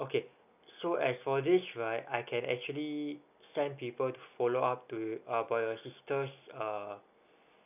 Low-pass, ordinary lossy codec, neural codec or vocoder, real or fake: 3.6 kHz; none; none; real